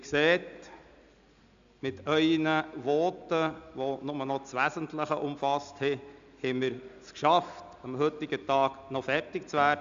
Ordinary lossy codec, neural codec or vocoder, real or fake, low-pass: AAC, 96 kbps; none; real; 7.2 kHz